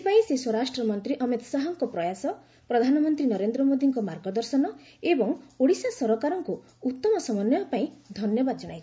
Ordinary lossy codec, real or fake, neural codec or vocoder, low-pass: none; real; none; none